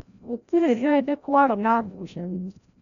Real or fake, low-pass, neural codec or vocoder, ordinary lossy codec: fake; 7.2 kHz; codec, 16 kHz, 0.5 kbps, FreqCodec, larger model; none